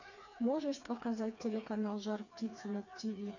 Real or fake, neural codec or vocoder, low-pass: fake; codec, 16 kHz, 4 kbps, FreqCodec, smaller model; 7.2 kHz